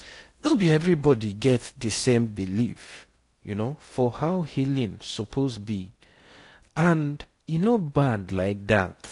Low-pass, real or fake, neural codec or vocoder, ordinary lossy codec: 10.8 kHz; fake; codec, 16 kHz in and 24 kHz out, 0.8 kbps, FocalCodec, streaming, 65536 codes; AAC, 48 kbps